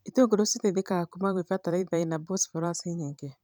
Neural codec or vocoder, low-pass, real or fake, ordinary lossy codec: vocoder, 44.1 kHz, 128 mel bands every 512 samples, BigVGAN v2; none; fake; none